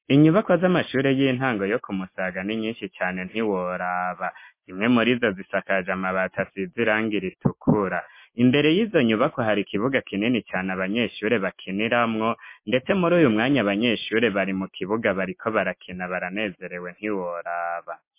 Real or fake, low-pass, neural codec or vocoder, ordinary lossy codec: real; 3.6 kHz; none; MP3, 24 kbps